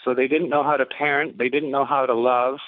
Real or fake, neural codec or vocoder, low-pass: fake; codec, 24 kHz, 6 kbps, HILCodec; 5.4 kHz